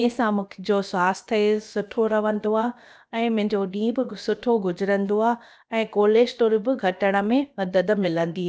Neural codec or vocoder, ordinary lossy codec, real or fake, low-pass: codec, 16 kHz, about 1 kbps, DyCAST, with the encoder's durations; none; fake; none